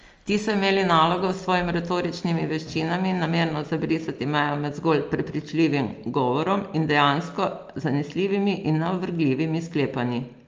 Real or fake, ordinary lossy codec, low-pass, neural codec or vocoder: real; Opus, 24 kbps; 7.2 kHz; none